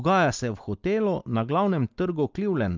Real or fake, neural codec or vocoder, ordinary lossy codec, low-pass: real; none; Opus, 32 kbps; 7.2 kHz